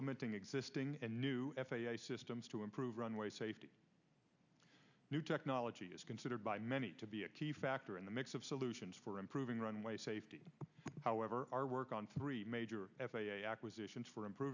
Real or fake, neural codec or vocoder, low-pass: real; none; 7.2 kHz